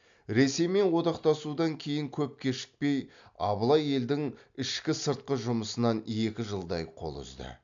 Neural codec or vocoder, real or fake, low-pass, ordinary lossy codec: none; real; 7.2 kHz; MP3, 64 kbps